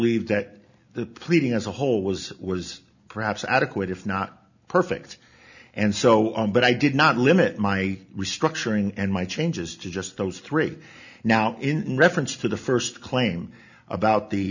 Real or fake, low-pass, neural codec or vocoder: real; 7.2 kHz; none